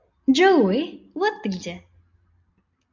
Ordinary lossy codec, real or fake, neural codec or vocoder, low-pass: MP3, 64 kbps; real; none; 7.2 kHz